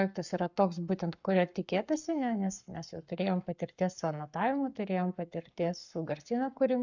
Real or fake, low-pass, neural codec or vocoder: fake; 7.2 kHz; codec, 44.1 kHz, 7.8 kbps, Pupu-Codec